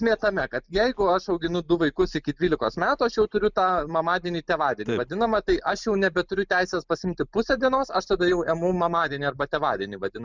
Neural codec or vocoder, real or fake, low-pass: none; real; 7.2 kHz